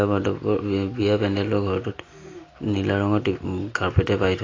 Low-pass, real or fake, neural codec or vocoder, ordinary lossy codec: 7.2 kHz; real; none; AAC, 32 kbps